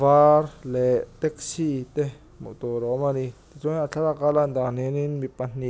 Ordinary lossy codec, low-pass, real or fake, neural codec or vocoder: none; none; real; none